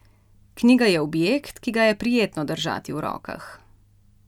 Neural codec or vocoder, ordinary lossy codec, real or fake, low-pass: none; none; real; 19.8 kHz